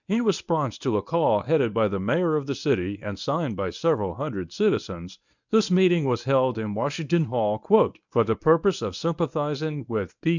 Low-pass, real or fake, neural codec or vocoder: 7.2 kHz; fake; codec, 24 kHz, 0.9 kbps, WavTokenizer, medium speech release version 1